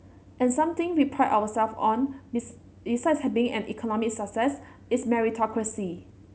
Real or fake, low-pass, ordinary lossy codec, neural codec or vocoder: real; none; none; none